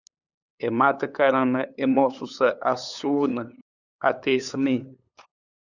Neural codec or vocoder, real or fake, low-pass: codec, 16 kHz, 8 kbps, FunCodec, trained on LibriTTS, 25 frames a second; fake; 7.2 kHz